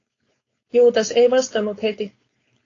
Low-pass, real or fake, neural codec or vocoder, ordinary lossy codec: 7.2 kHz; fake; codec, 16 kHz, 4.8 kbps, FACodec; AAC, 32 kbps